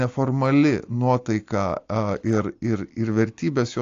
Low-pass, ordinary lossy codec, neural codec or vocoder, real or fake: 7.2 kHz; AAC, 48 kbps; none; real